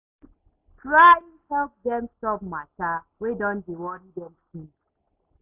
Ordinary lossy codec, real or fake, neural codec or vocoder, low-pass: Opus, 64 kbps; real; none; 3.6 kHz